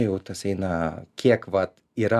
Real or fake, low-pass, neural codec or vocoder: real; 14.4 kHz; none